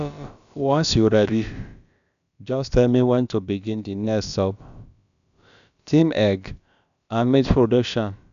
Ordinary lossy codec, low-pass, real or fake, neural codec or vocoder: none; 7.2 kHz; fake; codec, 16 kHz, about 1 kbps, DyCAST, with the encoder's durations